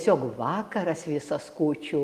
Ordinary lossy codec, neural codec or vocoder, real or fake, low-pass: Opus, 24 kbps; none; real; 14.4 kHz